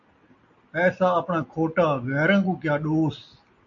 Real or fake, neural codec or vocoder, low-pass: real; none; 7.2 kHz